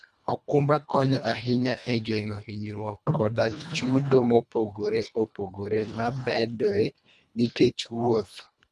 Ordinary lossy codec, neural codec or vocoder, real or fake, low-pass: none; codec, 24 kHz, 1.5 kbps, HILCodec; fake; none